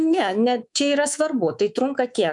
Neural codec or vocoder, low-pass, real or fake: codec, 24 kHz, 3.1 kbps, DualCodec; 10.8 kHz; fake